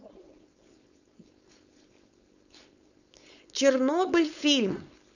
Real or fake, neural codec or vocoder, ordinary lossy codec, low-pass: fake; codec, 16 kHz, 4.8 kbps, FACodec; none; 7.2 kHz